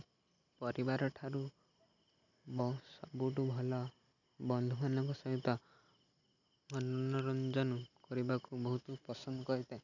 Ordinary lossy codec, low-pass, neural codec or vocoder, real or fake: none; 7.2 kHz; none; real